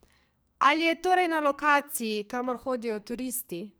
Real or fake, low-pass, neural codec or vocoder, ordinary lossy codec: fake; none; codec, 44.1 kHz, 2.6 kbps, SNAC; none